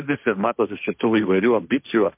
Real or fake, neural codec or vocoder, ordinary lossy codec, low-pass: fake; codec, 16 kHz, 1.1 kbps, Voila-Tokenizer; MP3, 32 kbps; 3.6 kHz